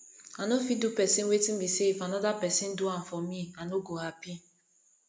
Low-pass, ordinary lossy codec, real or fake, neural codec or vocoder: none; none; real; none